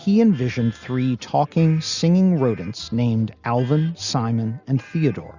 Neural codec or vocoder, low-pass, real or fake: none; 7.2 kHz; real